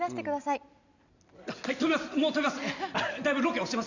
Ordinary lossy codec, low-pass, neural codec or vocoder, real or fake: none; 7.2 kHz; none; real